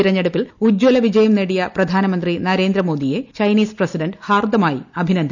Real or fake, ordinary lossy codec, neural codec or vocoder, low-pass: real; none; none; 7.2 kHz